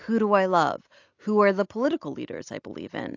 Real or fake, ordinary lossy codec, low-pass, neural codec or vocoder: real; AAC, 48 kbps; 7.2 kHz; none